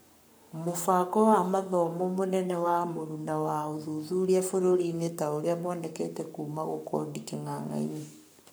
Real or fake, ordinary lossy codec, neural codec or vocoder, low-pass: fake; none; codec, 44.1 kHz, 7.8 kbps, Pupu-Codec; none